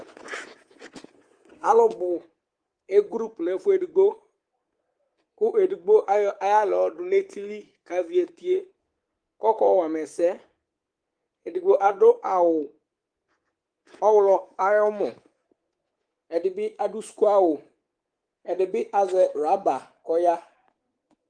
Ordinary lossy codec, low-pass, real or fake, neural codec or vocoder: Opus, 24 kbps; 9.9 kHz; fake; codec, 24 kHz, 3.1 kbps, DualCodec